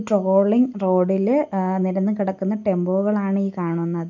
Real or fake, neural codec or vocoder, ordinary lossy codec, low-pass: real; none; none; 7.2 kHz